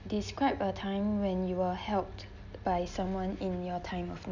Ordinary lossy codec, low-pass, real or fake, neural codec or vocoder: none; 7.2 kHz; real; none